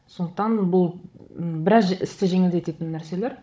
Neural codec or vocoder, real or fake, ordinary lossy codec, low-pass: codec, 16 kHz, 16 kbps, FunCodec, trained on Chinese and English, 50 frames a second; fake; none; none